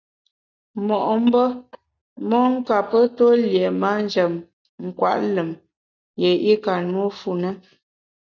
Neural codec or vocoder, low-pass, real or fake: none; 7.2 kHz; real